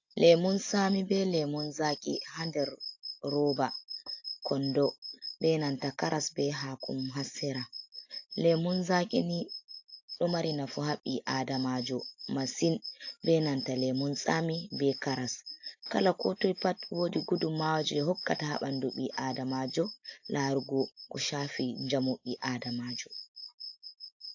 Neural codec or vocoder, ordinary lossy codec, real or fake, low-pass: none; AAC, 48 kbps; real; 7.2 kHz